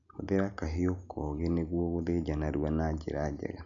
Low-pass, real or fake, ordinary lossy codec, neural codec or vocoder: 7.2 kHz; real; MP3, 96 kbps; none